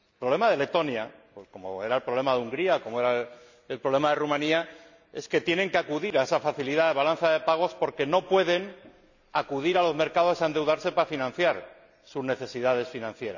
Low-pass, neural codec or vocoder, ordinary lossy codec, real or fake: 7.2 kHz; none; none; real